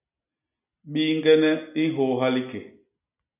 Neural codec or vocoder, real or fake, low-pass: none; real; 3.6 kHz